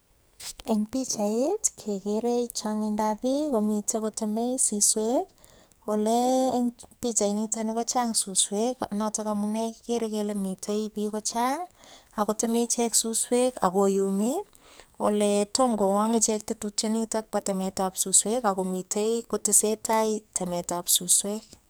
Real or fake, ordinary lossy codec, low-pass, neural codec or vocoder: fake; none; none; codec, 44.1 kHz, 2.6 kbps, SNAC